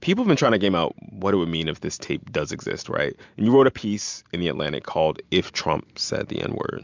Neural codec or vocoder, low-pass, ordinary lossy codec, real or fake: none; 7.2 kHz; MP3, 64 kbps; real